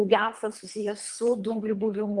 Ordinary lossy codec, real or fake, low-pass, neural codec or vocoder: Opus, 32 kbps; fake; 10.8 kHz; codec, 24 kHz, 3 kbps, HILCodec